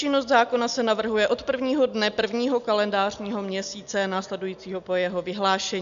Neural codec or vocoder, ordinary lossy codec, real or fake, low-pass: none; MP3, 96 kbps; real; 7.2 kHz